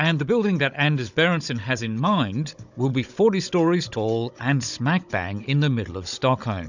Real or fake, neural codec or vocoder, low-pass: fake; codec, 16 kHz, 16 kbps, FunCodec, trained on Chinese and English, 50 frames a second; 7.2 kHz